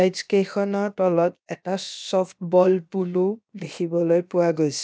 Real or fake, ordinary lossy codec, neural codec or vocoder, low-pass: fake; none; codec, 16 kHz, about 1 kbps, DyCAST, with the encoder's durations; none